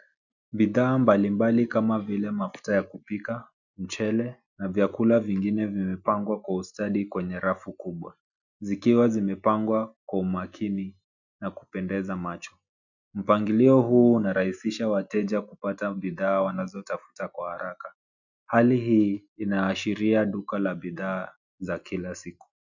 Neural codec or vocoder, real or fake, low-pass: none; real; 7.2 kHz